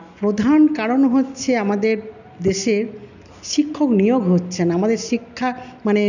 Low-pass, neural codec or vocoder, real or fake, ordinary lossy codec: 7.2 kHz; none; real; none